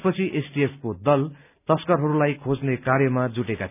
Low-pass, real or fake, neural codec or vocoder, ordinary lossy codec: 3.6 kHz; real; none; none